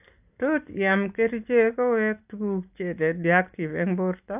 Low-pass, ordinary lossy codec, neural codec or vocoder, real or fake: 3.6 kHz; AAC, 32 kbps; none; real